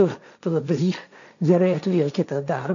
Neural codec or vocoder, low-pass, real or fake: codec, 16 kHz, 1.1 kbps, Voila-Tokenizer; 7.2 kHz; fake